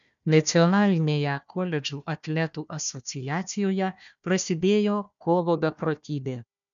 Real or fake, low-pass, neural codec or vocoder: fake; 7.2 kHz; codec, 16 kHz, 1 kbps, FunCodec, trained on Chinese and English, 50 frames a second